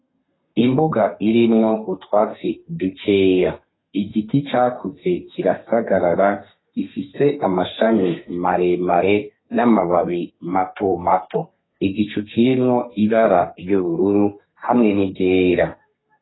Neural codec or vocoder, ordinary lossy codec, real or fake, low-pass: codec, 32 kHz, 1.9 kbps, SNAC; AAC, 16 kbps; fake; 7.2 kHz